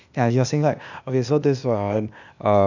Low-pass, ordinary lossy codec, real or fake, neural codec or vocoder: 7.2 kHz; none; fake; codec, 16 kHz, 0.8 kbps, ZipCodec